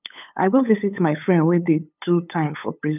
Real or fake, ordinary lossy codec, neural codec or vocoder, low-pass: fake; none; codec, 16 kHz, 8 kbps, FunCodec, trained on LibriTTS, 25 frames a second; 3.6 kHz